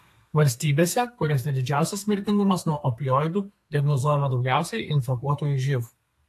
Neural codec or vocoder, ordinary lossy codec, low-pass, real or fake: codec, 32 kHz, 1.9 kbps, SNAC; AAC, 64 kbps; 14.4 kHz; fake